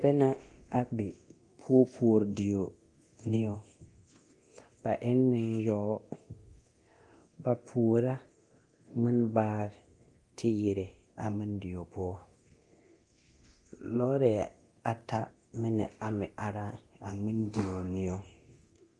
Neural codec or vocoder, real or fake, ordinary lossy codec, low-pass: codec, 24 kHz, 0.9 kbps, DualCodec; fake; Opus, 24 kbps; 10.8 kHz